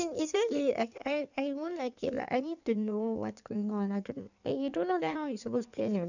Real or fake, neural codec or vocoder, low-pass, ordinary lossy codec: fake; codec, 16 kHz in and 24 kHz out, 1.1 kbps, FireRedTTS-2 codec; 7.2 kHz; none